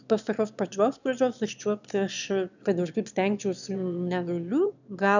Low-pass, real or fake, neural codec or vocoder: 7.2 kHz; fake; autoencoder, 22.05 kHz, a latent of 192 numbers a frame, VITS, trained on one speaker